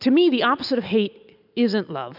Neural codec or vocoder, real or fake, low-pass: none; real; 5.4 kHz